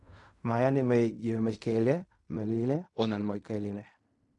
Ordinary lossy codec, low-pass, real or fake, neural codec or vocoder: none; 10.8 kHz; fake; codec, 16 kHz in and 24 kHz out, 0.4 kbps, LongCat-Audio-Codec, fine tuned four codebook decoder